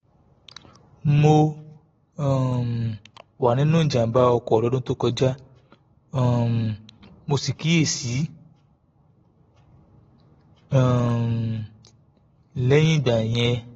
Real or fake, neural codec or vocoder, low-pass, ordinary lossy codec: real; none; 19.8 kHz; AAC, 24 kbps